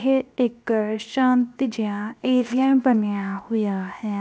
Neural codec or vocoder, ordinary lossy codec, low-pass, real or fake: codec, 16 kHz, 0.7 kbps, FocalCodec; none; none; fake